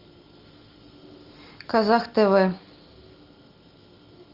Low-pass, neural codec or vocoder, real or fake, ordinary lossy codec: 5.4 kHz; none; real; Opus, 24 kbps